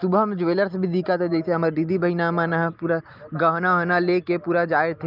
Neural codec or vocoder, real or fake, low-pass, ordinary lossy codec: none; real; 5.4 kHz; Opus, 24 kbps